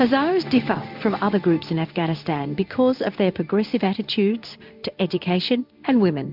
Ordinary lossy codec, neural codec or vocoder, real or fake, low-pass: MP3, 48 kbps; codec, 16 kHz in and 24 kHz out, 1 kbps, XY-Tokenizer; fake; 5.4 kHz